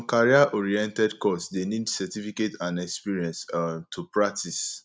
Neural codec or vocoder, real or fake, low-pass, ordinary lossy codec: none; real; none; none